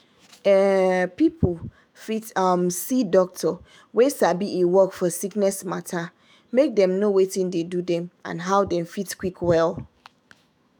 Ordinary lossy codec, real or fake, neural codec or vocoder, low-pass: none; fake; autoencoder, 48 kHz, 128 numbers a frame, DAC-VAE, trained on Japanese speech; none